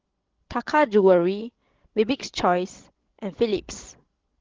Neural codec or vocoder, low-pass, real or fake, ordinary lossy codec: codec, 16 kHz, 16 kbps, FreqCodec, larger model; 7.2 kHz; fake; Opus, 16 kbps